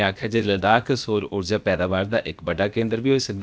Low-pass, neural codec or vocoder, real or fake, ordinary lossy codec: none; codec, 16 kHz, about 1 kbps, DyCAST, with the encoder's durations; fake; none